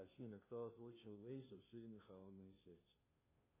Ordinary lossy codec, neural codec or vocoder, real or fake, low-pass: MP3, 16 kbps; codec, 16 kHz, 0.5 kbps, FunCodec, trained on Chinese and English, 25 frames a second; fake; 3.6 kHz